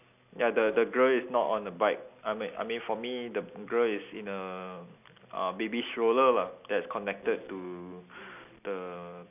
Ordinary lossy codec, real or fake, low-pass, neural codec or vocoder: none; real; 3.6 kHz; none